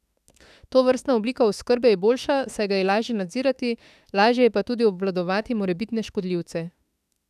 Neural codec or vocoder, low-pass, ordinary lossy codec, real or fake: autoencoder, 48 kHz, 32 numbers a frame, DAC-VAE, trained on Japanese speech; 14.4 kHz; none; fake